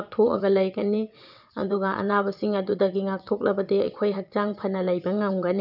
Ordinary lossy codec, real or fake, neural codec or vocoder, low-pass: none; fake; vocoder, 22.05 kHz, 80 mel bands, WaveNeXt; 5.4 kHz